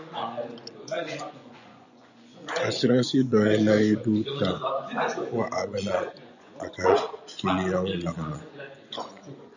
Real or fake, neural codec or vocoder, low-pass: fake; vocoder, 24 kHz, 100 mel bands, Vocos; 7.2 kHz